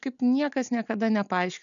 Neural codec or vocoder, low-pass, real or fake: none; 7.2 kHz; real